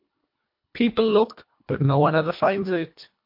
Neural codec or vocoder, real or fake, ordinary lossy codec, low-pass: codec, 24 kHz, 1.5 kbps, HILCodec; fake; MP3, 32 kbps; 5.4 kHz